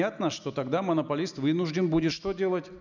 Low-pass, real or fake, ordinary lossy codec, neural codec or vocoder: 7.2 kHz; real; none; none